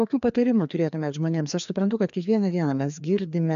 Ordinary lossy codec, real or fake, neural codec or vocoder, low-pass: AAC, 96 kbps; fake; codec, 16 kHz, 2 kbps, FreqCodec, larger model; 7.2 kHz